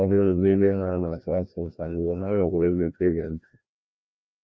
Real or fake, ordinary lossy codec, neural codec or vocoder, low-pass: fake; none; codec, 16 kHz, 1 kbps, FreqCodec, larger model; none